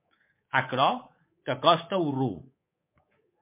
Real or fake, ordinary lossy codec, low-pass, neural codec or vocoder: fake; MP3, 24 kbps; 3.6 kHz; codec, 24 kHz, 3.1 kbps, DualCodec